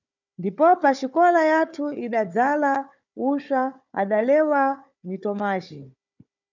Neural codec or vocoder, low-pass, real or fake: codec, 16 kHz, 4 kbps, FunCodec, trained on Chinese and English, 50 frames a second; 7.2 kHz; fake